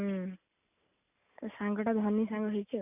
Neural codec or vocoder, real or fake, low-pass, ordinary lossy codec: none; real; 3.6 kHz; none